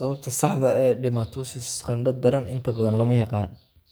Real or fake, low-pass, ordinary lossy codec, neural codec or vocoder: fake; none; none; codec, 44.1 kHz, 2.6 kbps, SNAC